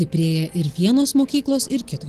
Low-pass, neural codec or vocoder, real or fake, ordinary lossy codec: 14.4 kHz; none; real; Opus, 16 kbps